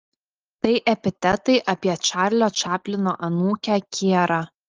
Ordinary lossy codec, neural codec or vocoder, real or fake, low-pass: Opus, 24 kbps; none; real; 7.2 kHz